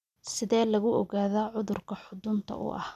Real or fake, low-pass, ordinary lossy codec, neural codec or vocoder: real; 14.4 kHz; none; none